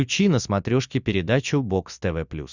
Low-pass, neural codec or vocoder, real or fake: 7.2 kHz; none; real